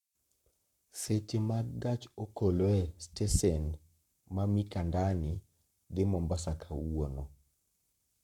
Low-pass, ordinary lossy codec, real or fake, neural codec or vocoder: 19.8 kHz; none; fake; codec, 44.1 kHz, 7.8 kbps, Pupu-Codec